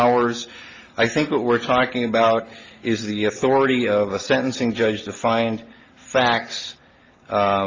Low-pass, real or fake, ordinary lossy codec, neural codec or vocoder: 7.2 kHz; real; Opus, 32 kbps; none